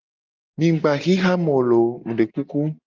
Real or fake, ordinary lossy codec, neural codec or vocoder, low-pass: real; Opus, 32 kbps; none; 7.2 kHz